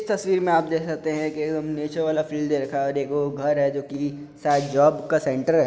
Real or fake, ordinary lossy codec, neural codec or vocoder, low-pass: real; none; none; none